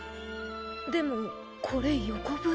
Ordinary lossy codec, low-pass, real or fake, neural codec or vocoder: none; none; real; none